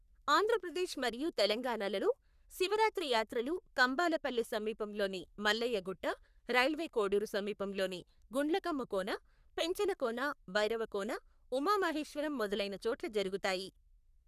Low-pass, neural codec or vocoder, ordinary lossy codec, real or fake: 14.4 kHz; codec, 44.1 kHz, 3.4 kbps, Pupu-Codec; none; fake